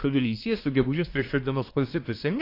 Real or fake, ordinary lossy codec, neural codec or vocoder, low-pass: fake; AAC, 32 kbps; codec, 24 kHz, 1 kbps, SNAC; 5.4 kHz